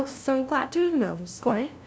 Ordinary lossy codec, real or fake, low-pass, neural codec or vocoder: none; fake; none; codec, 16 kHz, 0.5 kbps, FunCodec, trained on LibriTTS, 25 frames a second